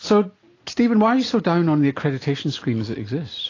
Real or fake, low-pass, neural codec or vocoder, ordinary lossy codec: real; 7.2 kHz; none; AAC, 32 kbps